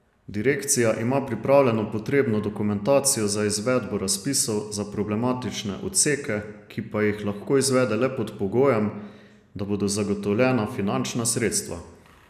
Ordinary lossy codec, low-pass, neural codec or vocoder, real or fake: none; 14.4 kHz; none; real